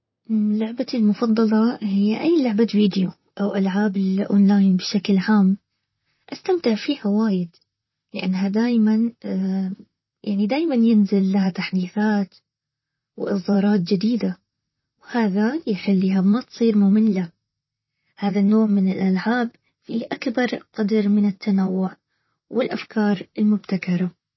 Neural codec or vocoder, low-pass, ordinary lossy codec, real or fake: vocoder, 44.1 kHz, 128 mel bands, Pupu-Vocoder; 7.2 kHz; MP3, 24 kbps; fake